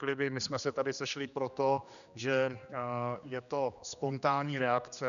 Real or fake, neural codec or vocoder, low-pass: fake; codec, 16 kHz, 2 kbps, X-Codec, HuBERT features, trained on general audio; 7.2 kHz